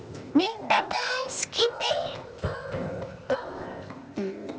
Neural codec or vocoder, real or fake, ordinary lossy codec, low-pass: codec, 16 kHz, 0.8 kbps, ZipCodec; fake; none; none